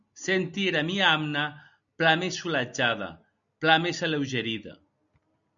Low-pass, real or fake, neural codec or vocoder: 7.2 kHz; real; none